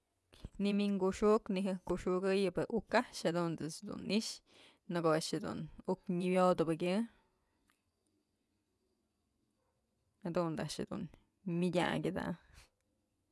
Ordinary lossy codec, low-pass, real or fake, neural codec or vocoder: none; none; fake; vocoder, 24 kHz, 100 mel bands, Vocos